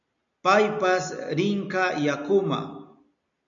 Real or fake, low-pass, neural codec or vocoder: real; 7.2 kHz; none